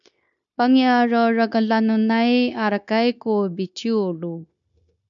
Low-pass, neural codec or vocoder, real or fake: 7.2 kHz; codec, 16 kHz, 0.9 kbps, LongCat-Audio-Codec; fake